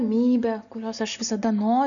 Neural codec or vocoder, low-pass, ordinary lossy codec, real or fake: none; 7.2 kHz; MP3, 96 kbps; real